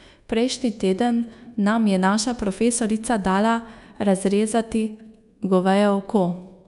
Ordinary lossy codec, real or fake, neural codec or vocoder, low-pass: none; fake; codec, 24 kHz, 1.2 kbps, DualCodec; 10.8 kHz